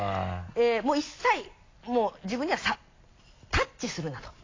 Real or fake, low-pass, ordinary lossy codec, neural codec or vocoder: real; 7.2 kHz; AAC, 32 kbps; none